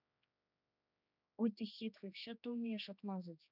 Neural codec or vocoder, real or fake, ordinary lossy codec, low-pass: codec, 16 kHz, 2 kbps, X-Codec, HuBERT features, trained on general audio; fake; none; 5.4 kHz